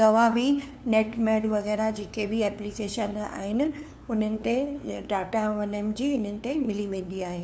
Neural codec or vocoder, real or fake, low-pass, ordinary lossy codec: codec, 16 kHz, 2 kbps, FunCodec, trained on LibriTTS, 25 frames a second; fake; none; none